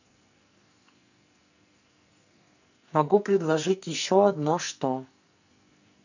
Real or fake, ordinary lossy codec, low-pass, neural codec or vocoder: fake; none; 7.2 kHz; codec, 44.1 kHz, 2.6 kbps, SNAC